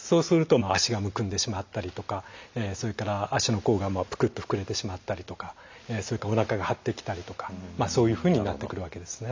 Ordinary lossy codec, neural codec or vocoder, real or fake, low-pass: MP3, 64 kbps; none; real; 7.2 kHz